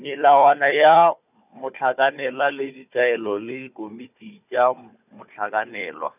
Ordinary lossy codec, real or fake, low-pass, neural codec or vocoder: none; fake; 3.6 kHz; codec, 16 kHz, 4 kbps, FunCodec, trained on Chinese and English, 50 frames a second